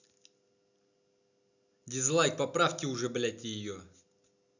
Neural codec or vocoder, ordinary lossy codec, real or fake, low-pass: none; none; real; 7.2 kHz